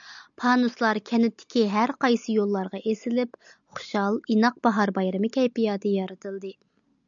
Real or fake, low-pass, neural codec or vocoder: real; 7.2 kHz; none